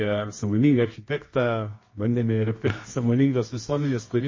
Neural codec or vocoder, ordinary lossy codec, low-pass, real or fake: codec, 24 kHz, 0.9 kbps, WavTokenizer, medium music audio release; MP3, 32 kbps; 7.2 kHz; fake